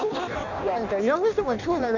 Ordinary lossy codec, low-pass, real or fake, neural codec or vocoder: none; 7.2 kHz; fake; codec, 16 kHz in and 24 kHz out, 0.6 kbps, FireRedTTS-2 codec